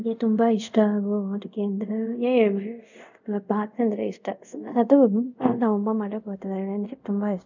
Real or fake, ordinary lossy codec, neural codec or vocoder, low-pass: fake; none; codec, 24 kHz, 0.5 kbps, DualCodec; 7.2 kHz